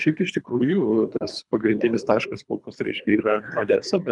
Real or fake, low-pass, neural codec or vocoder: fake; 10.8 kHz; codec, 24 kHz, 3 kbps, HILCodec